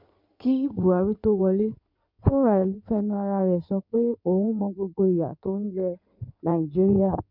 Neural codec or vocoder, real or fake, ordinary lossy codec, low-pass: codec, 16 kHz in and 24 kHz out, 2.2 kbps, FireRedTTS-2 codec; fake; MP3, 48 kbps; 5.4 kHz